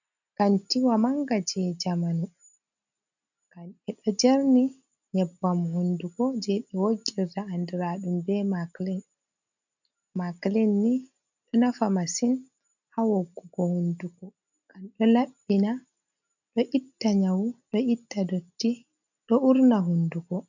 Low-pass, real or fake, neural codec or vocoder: 7.2 kHz; real; none